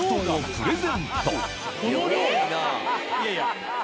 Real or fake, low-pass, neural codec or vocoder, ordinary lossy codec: real; none; none; none